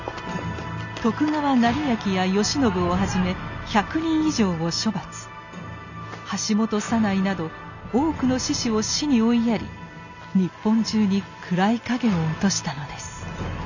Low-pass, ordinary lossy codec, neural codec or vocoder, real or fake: 7.2 kHz; none; none; real